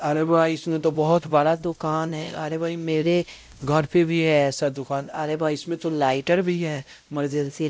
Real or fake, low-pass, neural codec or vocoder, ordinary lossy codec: fake; none; codec, 16 kHz, 0.5 kbps, X-Codec, WavLM features, trained on Multilingual LibriSpeech; none